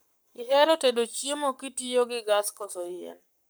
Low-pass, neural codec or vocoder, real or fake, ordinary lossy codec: none; codec, 44.1 kHz, 7.8 kbps, Pupu-Codec; fake; none